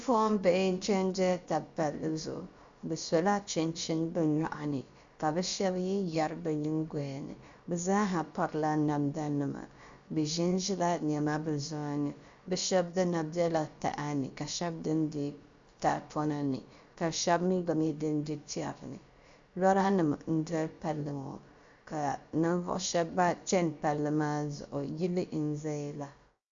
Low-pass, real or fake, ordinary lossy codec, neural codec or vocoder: 7.2 kHz; fake; Opus, 64 kbps; codec, 16 kHz, about 1 kbps, DyCAST, with the encoder's durations